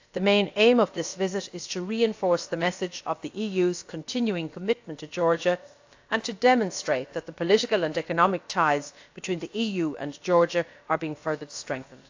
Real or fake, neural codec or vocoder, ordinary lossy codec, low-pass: fake; codec, 16 kHz, about 1 kbps, DyCAST, with the encoder's durations; AAC, 48 kbps; 7.2 kHz